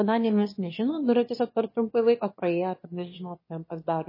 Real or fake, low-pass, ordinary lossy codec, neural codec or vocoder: fake; 5.4 kHz; MP3, 24 kbps; autoencoder, 22.05 kHz, a latent of 192 numbers a frame, VITS, trained on one speaker